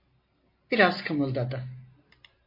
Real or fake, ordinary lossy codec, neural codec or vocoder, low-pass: real; MP3, 24 kbps; none; 5.4 kHz